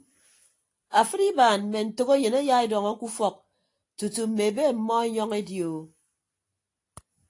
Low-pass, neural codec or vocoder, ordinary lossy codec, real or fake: 10.8 kHz; none; AAC, 48 kbps; real